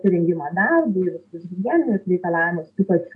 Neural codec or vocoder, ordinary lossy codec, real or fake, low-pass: none; AAC, 64 kbps; real; 10.8 kHz